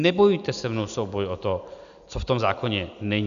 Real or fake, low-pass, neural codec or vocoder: real; 7.2 kHz; none